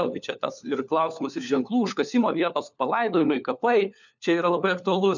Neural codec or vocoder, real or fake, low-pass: codec, 16 kHz, 4 kbps, FunCodec, trained on LibriTTS, 50 frames a second; fake; 7.2 kHz